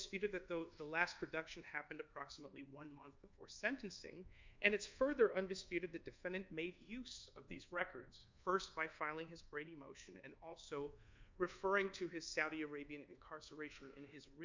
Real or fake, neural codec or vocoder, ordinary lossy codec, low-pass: fake; codec, 24 kHz, 1.2 kbps, DualCodec; MP3, 64 kbps; 7.2 kHz